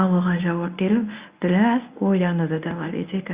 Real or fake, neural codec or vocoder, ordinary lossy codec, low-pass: fake; codec, 24 kHz, 0.9 kbps, WavTokenizer, medium speech release version 1; Opus, 64 kbps; 3.6 kHz